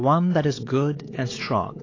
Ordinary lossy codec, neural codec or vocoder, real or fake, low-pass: AAC, 32 kbps; codec, 16 kHz, 4 kbps, X-Codec, WavLM features, trained on Multilingual LibriSpeech; fake; 7.2 kHz